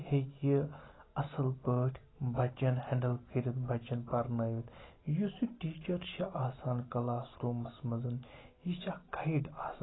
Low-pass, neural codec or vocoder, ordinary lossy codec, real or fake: 7.2 kHz; none; AAC, 16 kbps; real